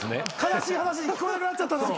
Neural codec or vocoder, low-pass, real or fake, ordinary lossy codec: none; none; real; none